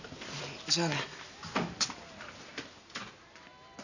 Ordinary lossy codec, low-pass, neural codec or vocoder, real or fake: none; 7.2 kHz; none; real